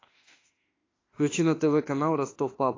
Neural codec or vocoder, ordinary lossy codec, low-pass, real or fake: autoencoder, 48 kHz, 32 numbers a frame, DAC-VAE, trained on Japanese speech; AAC, 32 kbps; 7.2 kHz; fake